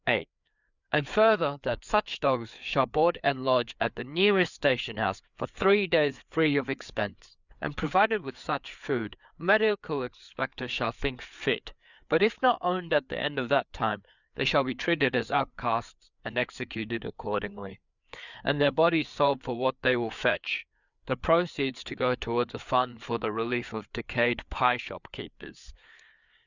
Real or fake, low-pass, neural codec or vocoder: fake; 7.2 kHz; codec, 16 kHz, 4 kbps, FreqCodec, larger model